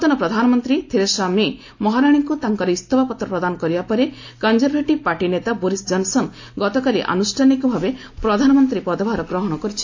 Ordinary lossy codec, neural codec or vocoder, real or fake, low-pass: AAC, 48 kbps; none; real; 7.2 kHz